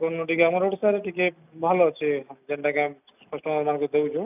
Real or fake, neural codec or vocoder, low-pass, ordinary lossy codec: real; none; 3.6 kHz; none